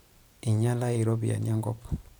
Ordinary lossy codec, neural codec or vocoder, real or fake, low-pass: none; vocoder, 44.1 kHz, 128 mel bands every 512 samples, BigVGAN v2; fake; none